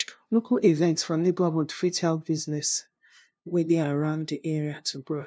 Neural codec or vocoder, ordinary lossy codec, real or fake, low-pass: codec, 16 kHz, 0.5 kbps, FunCodec, trained on LibriTTS, 25 frames a second; none; fake; none